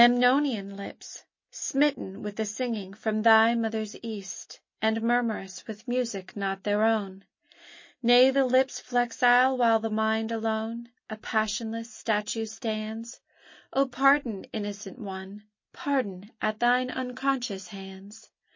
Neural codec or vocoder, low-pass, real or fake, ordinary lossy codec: none; 7.2 kHz; real; MP3, 32 kbps